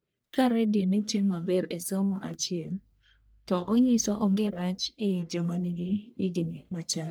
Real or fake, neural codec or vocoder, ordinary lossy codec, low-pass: fake; codec, 44.1 kHz, 1.7 kbps, Pupu-Codec; none; none